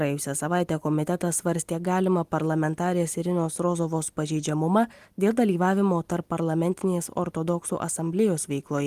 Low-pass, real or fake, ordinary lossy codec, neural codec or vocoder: 14.4 kHz; real; Opus, 24 kbps; none